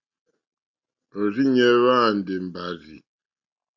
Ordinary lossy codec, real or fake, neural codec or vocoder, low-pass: Opus, 64 kbps; real; none; 7.2 kHz